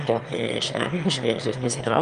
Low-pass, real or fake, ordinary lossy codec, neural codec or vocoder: 9.9 kHz; fake; Opus, 32 kbps; autoencoder, 22.05 kHz, a latent of 192 numbers a frame, VITS, trained on one speaker